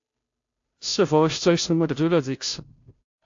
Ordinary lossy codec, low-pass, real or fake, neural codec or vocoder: AAC, 48 kbps; 7.2 kHz; fake; codec, 16 kHz, 0.5 kbps, FunCodec, trained on Chinese and English, 25 frames a second